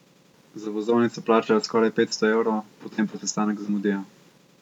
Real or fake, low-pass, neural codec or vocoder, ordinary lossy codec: real; 19.8 kHz; none; none